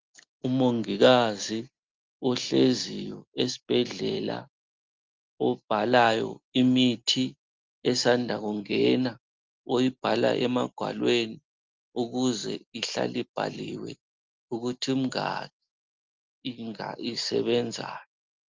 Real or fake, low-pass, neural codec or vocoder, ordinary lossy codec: real; 7.2 kHz; none; Opus, 24 kbps